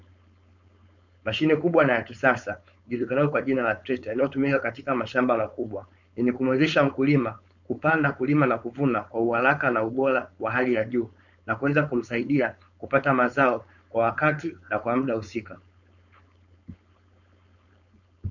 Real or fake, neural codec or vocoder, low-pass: fake; codec, 16 kHz, 4.8 kbps, FACodec; 7.2 kHz